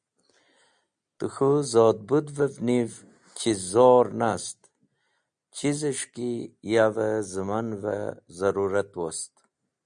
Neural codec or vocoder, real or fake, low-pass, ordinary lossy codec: none; real; 9.9 kHz; MP3, 96 kbps